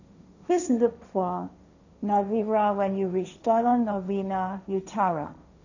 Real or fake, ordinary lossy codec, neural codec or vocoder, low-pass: fake; none; codec, 16 kHz, 1.1 kbps, Voila-Tokenizer; 7.2 kHz